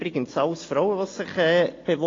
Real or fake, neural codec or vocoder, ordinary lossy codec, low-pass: real; none; AAC, 32 kbps; 7.2 kHz